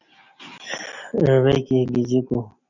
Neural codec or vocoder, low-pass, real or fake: none; 7.2 kHz; real